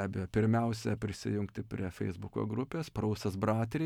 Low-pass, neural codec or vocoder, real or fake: 19.8 kHz; none; real